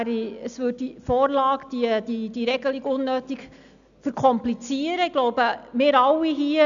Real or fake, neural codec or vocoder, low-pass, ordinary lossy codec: real; none; 7.2 kHz; none